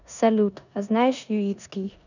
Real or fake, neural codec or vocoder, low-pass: fake; codec, 16 kHz in and 24 kHz out, 0.9 kbps, LongCat-Audio-Codec, four codebook decoder; 7.2 kHz